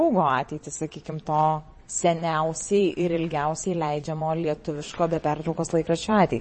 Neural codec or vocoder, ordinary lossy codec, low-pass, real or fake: vocoder, 22.05 kHz, 80 mel bands, Vocos; MP3, 32 kbps; 9.9 kHz; fake